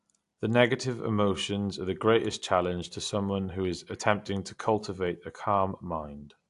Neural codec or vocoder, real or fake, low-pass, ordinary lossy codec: none; real; 10.8 kHz; AAC, 64 kbps